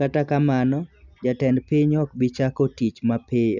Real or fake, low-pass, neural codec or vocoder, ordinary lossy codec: real; 7.2 kHz; none; none